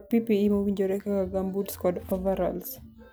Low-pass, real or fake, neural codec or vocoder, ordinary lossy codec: none; real; none; none